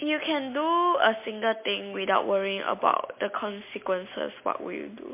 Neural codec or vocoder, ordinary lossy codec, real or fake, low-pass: none; MP3, 24 kbps; real; 3.6 kHz